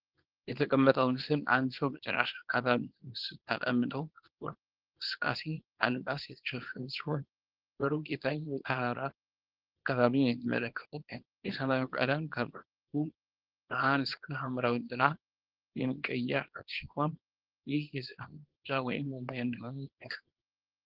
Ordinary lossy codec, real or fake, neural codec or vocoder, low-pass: Opus, 16 kbps; fake; codec, 24 kHz, 0.9 kbps, WavTokenizer, small release; 5.4 kHz